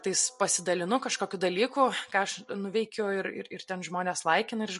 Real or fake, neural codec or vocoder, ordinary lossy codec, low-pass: real; none; MP3, 48 kbps; 14.4 kHz